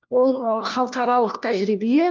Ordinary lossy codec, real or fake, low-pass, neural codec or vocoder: Opus, 24 kbps; fake; 7.2 kHz; codec, 16 kHz, 1 kbps, FunCodec, trained on LibriTTS, 50 frames a second